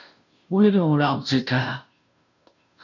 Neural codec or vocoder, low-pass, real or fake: codec, 16 kHz, 0.5 kbps, FunCodec, trained on Chinese and English, 25 frames a second; 7.2 kHz; fake